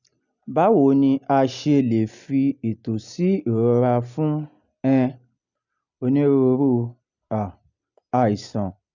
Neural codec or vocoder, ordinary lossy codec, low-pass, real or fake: none; none; 7.2 kHz; real